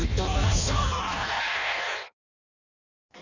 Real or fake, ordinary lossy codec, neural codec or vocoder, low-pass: fake; none; codec, 16 kHz in and 24 kHz out, 0.6 kbps, FireRedTTS-2 codec; 7.2 kHz